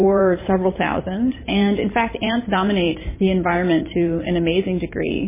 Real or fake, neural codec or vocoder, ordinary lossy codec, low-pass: fake; vocoder, 44.1 kHz, 128 mel bands every 512 samples, BigVGAN v2; MP3, 16 kbps; 3.6 kHz